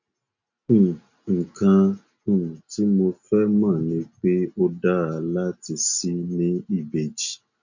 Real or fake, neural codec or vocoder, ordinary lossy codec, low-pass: real; none; none; 7.2 kHz